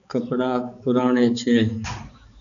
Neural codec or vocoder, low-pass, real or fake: codec, 16 kHz, 4 kbps, X-Codec, HuBERT features, trained on balanced general audio; 7.2 kHz; fake